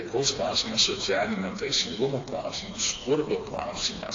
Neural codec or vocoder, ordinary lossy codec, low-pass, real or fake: codec, 16 kHz, 2 kbps, FreqCodec, smaller model; AAC, 32 kbps; 7.2 kHz; fake